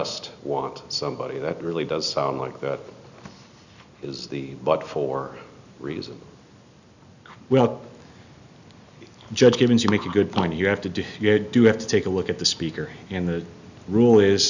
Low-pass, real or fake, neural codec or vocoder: 7.2 kHz; real; none